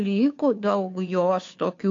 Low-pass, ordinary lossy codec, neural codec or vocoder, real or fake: 7.2 kHz; AAC, 48 kbps; codec, 16 kHz, 2 kbps, FunCodec, trained on Chinese and English, 25 frames a second; fake